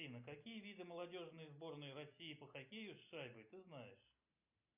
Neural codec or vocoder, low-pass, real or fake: none; 3.6 kHz; real